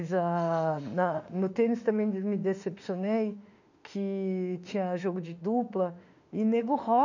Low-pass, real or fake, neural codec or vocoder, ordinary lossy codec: 7.2 kHz; fake; autoencoder, 48 kHz, 32 numbers a frame, DAC-VAE, trained on Japanese speech; none